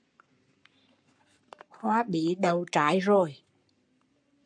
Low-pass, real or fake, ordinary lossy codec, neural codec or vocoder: 9.9 kHz; fake; AAC, 64 kbps; codec, 44.1 kHz, 7.8 kbps, Pupu-Codec